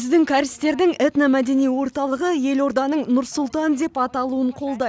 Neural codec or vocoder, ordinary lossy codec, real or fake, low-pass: none; none; real; none